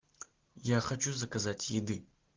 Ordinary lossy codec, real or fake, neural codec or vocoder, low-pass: Opus, 16 kbps; real; none; 7.2 kHz